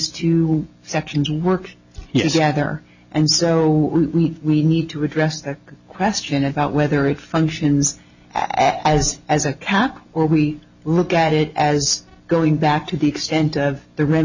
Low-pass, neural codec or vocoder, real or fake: 7.2 kHz; none; real